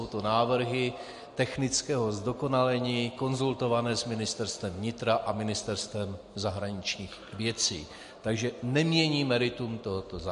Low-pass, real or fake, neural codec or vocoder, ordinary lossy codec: 14.4 kHz; real; none; MP3, 48 kbps